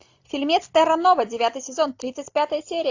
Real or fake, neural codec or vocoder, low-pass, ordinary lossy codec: real; none; 7.2 kHz; AAC, 48 kbps